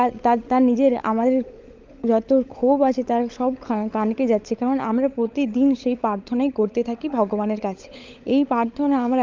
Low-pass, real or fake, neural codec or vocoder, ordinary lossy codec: 7.2 kHz; fake; codec, 16 kHz, 16 kbps, FunCodec, trained on LibriTTS, 50 frames a second; Opus, 24 kbps